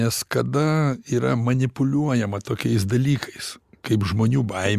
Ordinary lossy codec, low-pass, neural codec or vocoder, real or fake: Opus, 64 kbps; 14.4 kHz; none; real